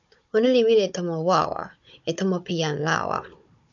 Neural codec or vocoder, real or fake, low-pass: codec, 16 kHz, 16 kbps, FunCodec, trained on Chinese and English, 50 frames a second; fake; 7.2 kHz